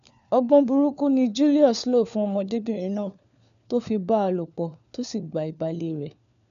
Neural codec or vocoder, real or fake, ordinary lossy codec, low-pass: codec, 16 kHz, 4 kbps, FunCodec, trained on LibriTTS, 50 frames a second; fake; none; 7.2 kHz